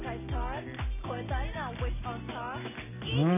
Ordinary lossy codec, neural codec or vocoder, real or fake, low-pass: none; none; real; 3.6 kHz